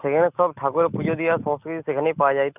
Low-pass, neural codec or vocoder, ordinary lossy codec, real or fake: 3.6 kHz; none; none; real